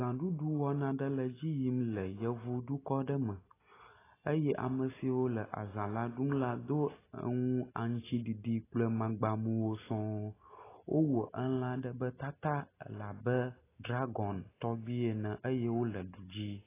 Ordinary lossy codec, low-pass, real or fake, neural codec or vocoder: AAC, 16 kbps; 3.6 kHz; real; none